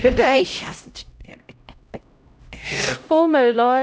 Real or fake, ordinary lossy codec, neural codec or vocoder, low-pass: fake; none; codec, 16 kHz, 0.5 kbps, X-Codec, HuBERT features, trained on LibriSpeech; none